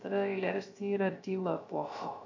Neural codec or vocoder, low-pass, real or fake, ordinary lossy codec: codec, 16 kHz, 0.3 kbps, FocalCodec; 7.2 kHz; fake; AAC, 48 kbps